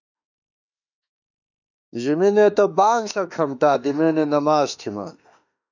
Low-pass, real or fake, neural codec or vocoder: 7.2 kHz; fake; autoencoder, 48 kHz, 32 numbers a frame, DAC-VAE, trained on Japanese speech